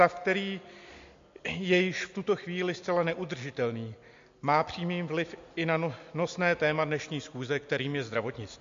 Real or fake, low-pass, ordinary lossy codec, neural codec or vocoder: real; 7.2 kHz; MP3, 48 kbps; none